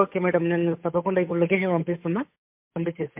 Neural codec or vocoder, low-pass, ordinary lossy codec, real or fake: codec, 16 kHz, 8 kbps, FunCodec, trained on Chinese and English, 25 frames a second; 3.6 kHz; MP3, 32 kbps; fake